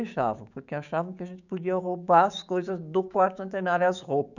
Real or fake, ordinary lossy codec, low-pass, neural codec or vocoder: fake; none; 7.2 kHz; codec, 16 kHz, 6 kbps, DAC